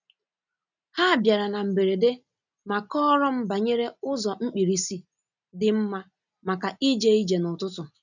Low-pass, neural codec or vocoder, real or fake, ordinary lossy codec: 7.2 kHz; none; real; none